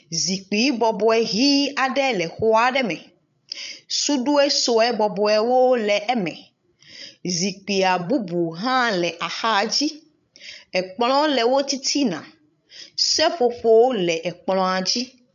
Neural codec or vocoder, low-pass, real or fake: codec, 16 kHz, 16 kbps, FreqCodec, larger model; 7.2 kHz; fake